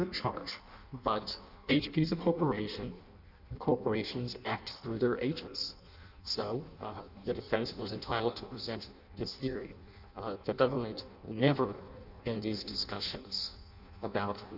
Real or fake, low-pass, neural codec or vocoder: fake; 5.4 kHz; codec, 16 kHz in and 24 kHz out, 0.6 kbps, FireRedTTS-2 codec